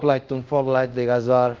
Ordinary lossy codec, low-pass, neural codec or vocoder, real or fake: Opus, 24 kbps; 7.2 kHz; codec, 24 kHz, 0.5 kbps, DualCodec; fake